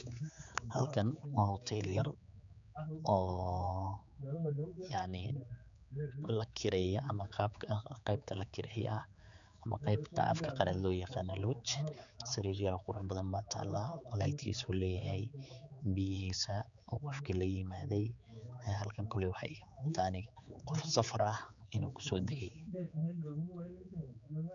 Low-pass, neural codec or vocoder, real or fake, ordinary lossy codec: 7.2 kHz; codec, 16 kHz, 4 kbps, X-Codec, HuBERT features, trained on general audio; fake; none